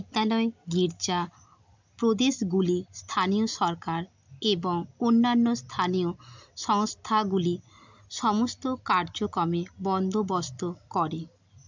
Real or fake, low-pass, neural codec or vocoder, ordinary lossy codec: real; 7.2 kHz; none; MP3, 64 kbps